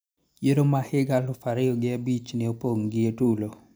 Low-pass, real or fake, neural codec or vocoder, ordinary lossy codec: none; real; none; none